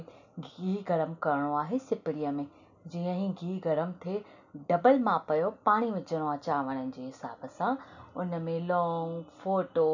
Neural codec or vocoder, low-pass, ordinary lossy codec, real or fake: none; 7.2 kHz; none; real